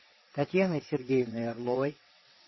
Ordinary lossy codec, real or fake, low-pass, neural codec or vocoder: MP3, 24 kbps; fake; 7.2 kHz; vocoder, 22.05 kHz, 80 mel bands, WaveNeXt